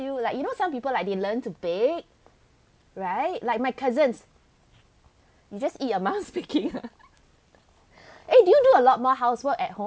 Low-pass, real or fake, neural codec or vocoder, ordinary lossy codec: none; real; none; none